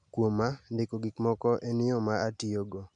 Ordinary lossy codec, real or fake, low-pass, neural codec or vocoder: none; real; 9.9 kHz; none